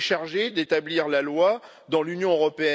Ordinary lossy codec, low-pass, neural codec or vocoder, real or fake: none; none; none; real